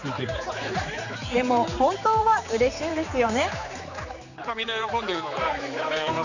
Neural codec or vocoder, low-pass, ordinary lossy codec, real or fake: codec, 16 kHz, 4 kbps, X-Codec, HuBERT features, trained on general audio; 7.2 kHz; none; fake